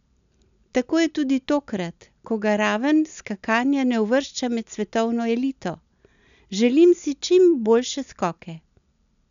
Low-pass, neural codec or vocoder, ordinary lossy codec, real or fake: 7.2 kHz; none; none; real